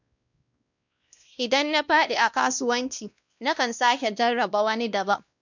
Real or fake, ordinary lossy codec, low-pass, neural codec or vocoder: fake; none; 7.2 kHz; codec, 16 kHz, 1 kbps, X-Codec, WavLM features, trained on Multilingual LibriSpeech